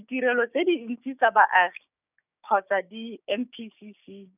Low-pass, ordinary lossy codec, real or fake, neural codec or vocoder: 3.6 kHz; none; fake; codec, 16 kHz, 6 kbps, DAC